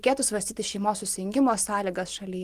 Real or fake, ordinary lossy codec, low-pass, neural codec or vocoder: real; Opus, 24 kbps; 14.4 kHz; none